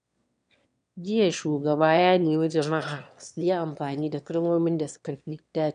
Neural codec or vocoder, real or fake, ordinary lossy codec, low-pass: autoencoder, 22.05 kHz, a latent of 192 numbers a frame, VITS, trained on one speaker; fake; none; 9.9 kHz